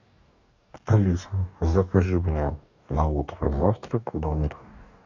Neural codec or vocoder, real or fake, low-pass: codec, 44.1 kHz, 2.6 kbps, DAC; fake; 7.2 kHz